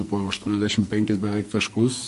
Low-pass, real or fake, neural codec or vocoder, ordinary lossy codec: 14.4 kHz; fake; codec, 44.1 kHz, 2.6 kbps, SNAC; MP3, 48 kbps